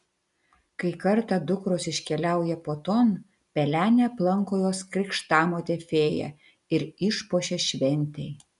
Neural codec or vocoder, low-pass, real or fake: none; 10.8 kHz; real